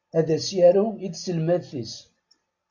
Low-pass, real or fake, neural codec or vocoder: 7.2 kHz; real; none